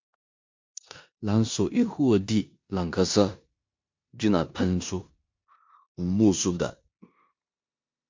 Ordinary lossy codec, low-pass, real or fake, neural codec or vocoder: MP3, 48 kbps; 7.2 kHz; fake; codec, 16 kHz in and 24 kHz out, 0.9 kbps, LongCat-Audio-Codec, four codebook decoder